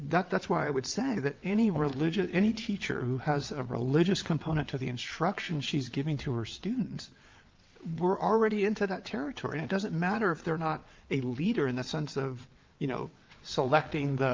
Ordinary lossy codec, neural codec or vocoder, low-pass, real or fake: Opus, 24 kbps; vocoder, 22.05 kHz, 80 mel bands, WaveNeXt; 7.2 kHz; fake